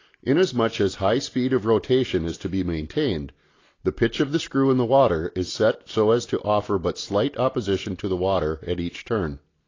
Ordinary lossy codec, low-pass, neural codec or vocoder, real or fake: AAC, 32 kbps; 7.2 kHz; none; real